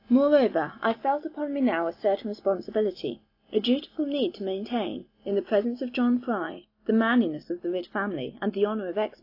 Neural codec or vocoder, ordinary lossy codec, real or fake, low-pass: none; AAC, 32 kbps; real; 5.4 kHz